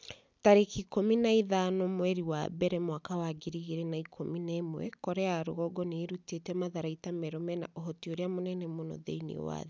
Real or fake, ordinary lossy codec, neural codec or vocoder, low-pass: real; none; none; none